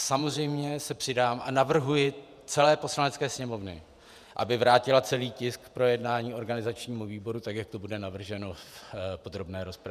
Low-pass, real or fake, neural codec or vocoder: 14.4 kHz; fake; vocoder, 48 kHz, 128 mel bands, Vocos